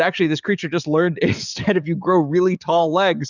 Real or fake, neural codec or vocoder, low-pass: fake; codec, 44.1 kHz, 7.8 kbps, DAC; 7.2 kHz